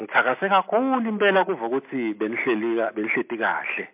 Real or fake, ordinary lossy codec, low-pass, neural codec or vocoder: real; MP3, 32 kbps; 3.6 kHz; none